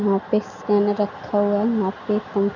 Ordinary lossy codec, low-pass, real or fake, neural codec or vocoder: none; 7.2 kHz; real; none